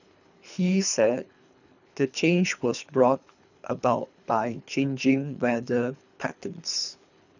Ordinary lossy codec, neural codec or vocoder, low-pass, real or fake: none; codec, 24 kHz, 3 kbps, HILCodec; 7.2 kHz; fake